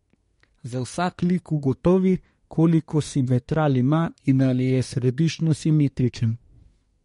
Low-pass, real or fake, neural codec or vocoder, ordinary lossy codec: 10.8 kHz; fake; codec, 24 kHz, 1 kbps, SNAC; MP3, 48 kbps